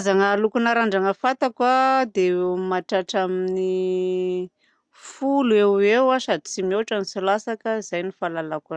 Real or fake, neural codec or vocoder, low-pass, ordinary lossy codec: real; none; 9.9 kHz; Opus, 64 kbps